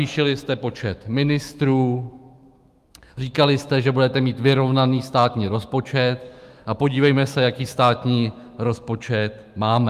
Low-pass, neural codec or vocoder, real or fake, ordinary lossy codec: 14.4 kHz; autoencoder, 48 kHz, 128 numbers a frame, DAC-VAE, trained on Japanese speech; fake; Opus, 32 kbps